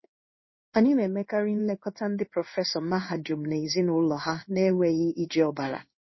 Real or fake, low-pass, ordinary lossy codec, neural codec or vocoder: fake; 7.2 kHz; MP3, 24 kbps; codec, 16 kHz in and 24 kHz out, 1 kbps, XY-Tokenizer